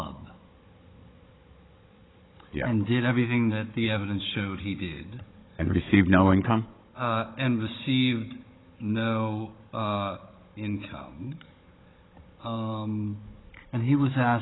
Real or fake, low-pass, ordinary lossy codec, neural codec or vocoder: fake; 7.2 kHz; AAC, 16 kbps; codec, 16 kHz, 16 kbps, FunCodec, trained on Chinese and English, 50 frames a second